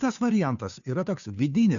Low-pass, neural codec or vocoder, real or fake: 7.2 kHz; codec, 16 kHz, 2 kbps, FunCodec, trained on Chinese and English, 25 frames a second; fake